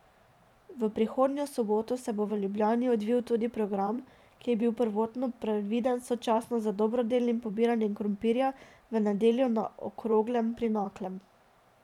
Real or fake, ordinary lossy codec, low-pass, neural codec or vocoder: fake; none; 19.8 kHz; vocoder, 44.1 kHz, 128 mel bands every 512 samples, BigVGAN v2